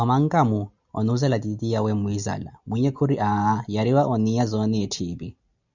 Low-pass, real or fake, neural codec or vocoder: 7.2 kHz; real; none